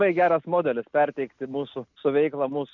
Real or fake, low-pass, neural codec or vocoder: real; 7.2 kHz; none